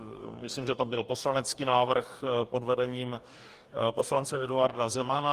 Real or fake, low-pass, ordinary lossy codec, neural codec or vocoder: fake; 14.4 kHz; Opus, 24 kbps; codec, 44.1 kHz, 2.6 kbps, DAC